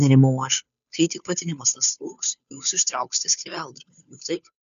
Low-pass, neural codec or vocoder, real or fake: 7.2 kHz; codec, 16 kHz, 2 kbps, FunCodec, trained on Chinese and English, 25 frames a second; fake